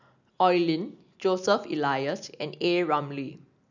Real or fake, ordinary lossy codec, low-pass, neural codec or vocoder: real; none; 7.2 kHz; none